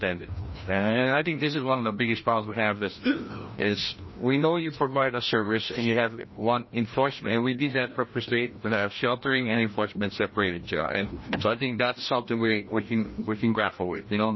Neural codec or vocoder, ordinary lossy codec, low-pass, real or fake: codec, 16 kHz, 1 kbps, FreqCodec, larger model; MP3, 24 kbps; 7.2 kHz; fake